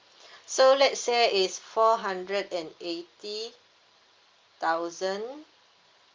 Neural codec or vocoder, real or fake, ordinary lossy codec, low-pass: none; real; Opus, 32 kbps; 7.2 kHz